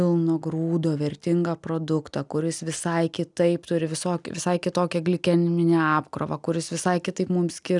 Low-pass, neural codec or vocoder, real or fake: 10.8 kHz; none; real